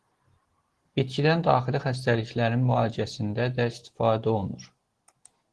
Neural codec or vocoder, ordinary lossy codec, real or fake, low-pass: none; Opus, 16 kbps; real; 10.8 kHz